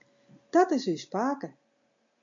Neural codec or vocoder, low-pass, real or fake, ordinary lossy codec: none; 7.2 kHz; real; AAC, 64 kbps